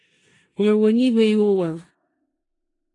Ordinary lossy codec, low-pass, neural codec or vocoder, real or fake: AAC, 32 kbps; 10.8 kHz; codec, 16 kHz in and 24 kHz out, 0.4 kbps, LongCat-Audio-Codec, four codebook decoder; fake